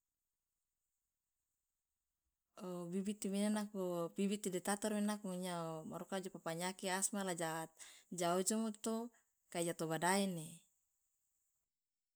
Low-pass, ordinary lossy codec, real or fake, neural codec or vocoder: none; none; real; none